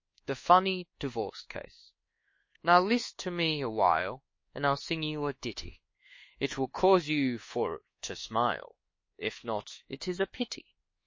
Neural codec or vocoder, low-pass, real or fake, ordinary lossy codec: codec, 24 kHz, 1.2 kbps, DualCodec; 7.2 kHz; fake; MP3, 32 kbps